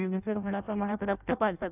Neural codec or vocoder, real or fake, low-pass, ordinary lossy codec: codec, 16 kHz in and 24 kHz out, 0.6 kbps, FireRedTTS-2 codec; fake; 3.6 kHz; none